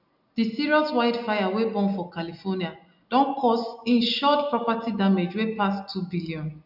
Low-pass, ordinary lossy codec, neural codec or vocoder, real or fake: 5.4 kHz; none; none; real